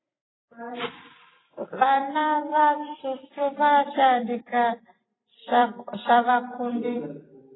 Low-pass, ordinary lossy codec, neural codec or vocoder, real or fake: 7.2 kHz; AAC, 16 kbps; vocoder, 44.1 kHz, 128 mel bands every 256 samples, BigVGAN v2; fake